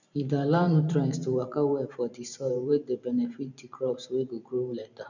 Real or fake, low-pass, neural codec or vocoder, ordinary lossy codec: fake; 7.2 kHz; vocoder, 44.1 kHz, 128 mel bands every 512 samples, BigVGAN v2; none